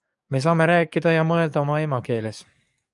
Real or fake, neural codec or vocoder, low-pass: fake; codec, 44.1 kHz, 7.8 kbps, DAC; 10.8 kHz